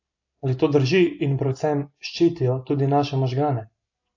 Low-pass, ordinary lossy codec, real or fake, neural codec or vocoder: 7.2 kHz; AAC, 48 kbps; real; none